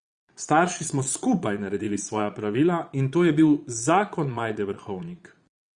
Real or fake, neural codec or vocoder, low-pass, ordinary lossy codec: fake; vocoder, 22.05 kHz, 80 mel bands, Vocos; 9.9 kHz; Opus, 64 kbps